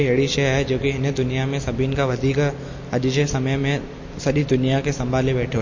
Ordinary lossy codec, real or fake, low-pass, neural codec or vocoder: MP3, 32 kbps; real; 7.2 kHz; none